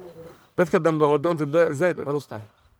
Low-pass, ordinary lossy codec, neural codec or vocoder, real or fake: none; none; codec, 44.1 kHz, 1.7 kbps, Pupu-Codec; fake